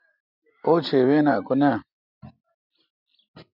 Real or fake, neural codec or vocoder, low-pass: real; none; 5.4 kHz